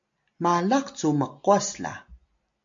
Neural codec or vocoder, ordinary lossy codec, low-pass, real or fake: none; AAC, 64 kbps; 7.2 kHz; real